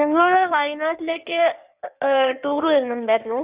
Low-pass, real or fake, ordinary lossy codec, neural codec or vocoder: 3.6 kHz; fake; Opus, 64 kbps; codec, 16 kHz in and 24 kHz out, 1.1 kbps, FireRedTTS-2 codec